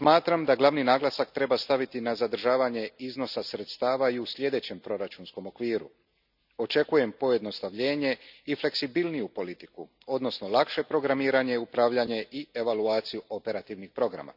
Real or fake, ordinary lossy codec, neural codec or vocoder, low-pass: real; none; none; 5.4 kHz